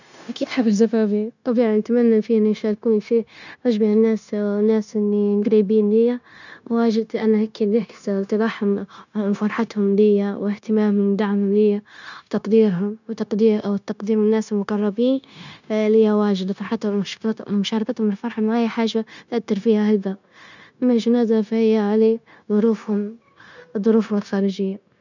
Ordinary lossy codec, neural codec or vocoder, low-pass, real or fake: none; codec, 16 kHz, 0.9 kbps, LongCat-Audio-Codec; 7.2 kHz; fake